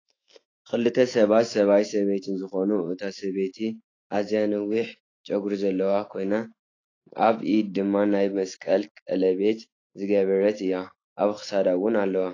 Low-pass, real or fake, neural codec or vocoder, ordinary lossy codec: 7.2 kHz; fake; autoencoder, 48 kHz, 128 numbers a frame, DAC-VAE, trained on Japanese speech; AAC, 32 kbps